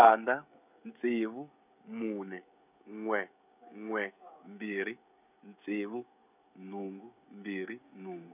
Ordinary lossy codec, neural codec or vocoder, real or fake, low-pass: none; codec, 16 kHz, 16 kbps, FreqCodec, smaller model; fake; 3.6 kHz